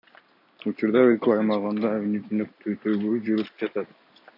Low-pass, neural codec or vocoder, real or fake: 5.4 kHz; none; real